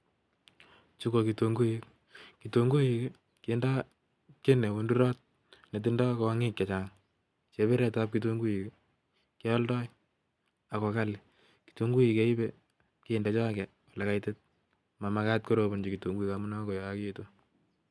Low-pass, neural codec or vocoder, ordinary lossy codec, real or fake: none; none; none; real